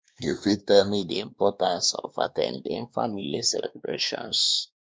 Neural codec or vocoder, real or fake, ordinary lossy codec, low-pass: codec, 16 kHz, 2 kbps, X-Codec, WavLM features, trained on Multilingual LibriSpeech; fake; none; none